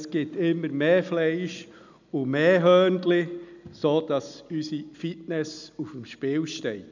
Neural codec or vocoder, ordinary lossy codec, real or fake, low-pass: none; none; real; 7.2 kHz